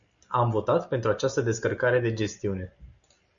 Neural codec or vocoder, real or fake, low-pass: none; real; 7.2 kHz